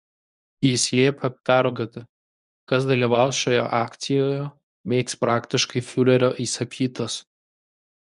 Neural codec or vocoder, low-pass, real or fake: codec, 24 kHz, 0.9 kbps, WavTokenizer, medium speech release version 1; 10.8 kHz; fake